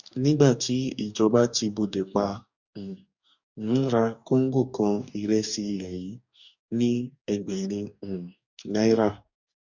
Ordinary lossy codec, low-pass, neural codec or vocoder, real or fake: none; 7.2 kHz; codec, 44.1 kHz, 2.6 kbps, DAC; fake